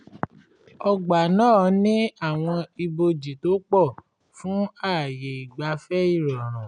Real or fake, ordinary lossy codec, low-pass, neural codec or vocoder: real; none; 9.9 kHz; none